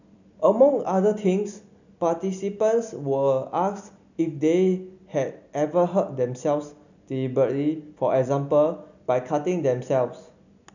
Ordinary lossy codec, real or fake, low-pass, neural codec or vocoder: none; real; 7.2 kHz; none